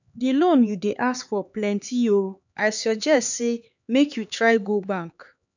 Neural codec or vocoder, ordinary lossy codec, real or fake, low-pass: codec, 16 kHz, 4 kbps, X-Codec, HuBERT features, trained on LibriSpeech; none; fake; 7.2 kHz